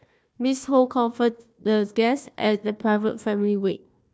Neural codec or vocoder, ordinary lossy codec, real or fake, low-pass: codec, 16 kHz, 1 kbps, FunCodec, trained on Chinese and English, 50 frames a second; none; fake; none